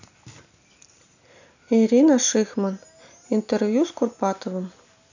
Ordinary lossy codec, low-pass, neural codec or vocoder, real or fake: none; 7.2 kHz; none; real